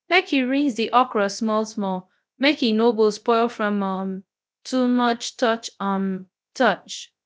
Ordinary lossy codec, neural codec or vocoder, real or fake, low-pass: none; codec, 16 kHz, 0.3 kbps, FocalCodec; fake; none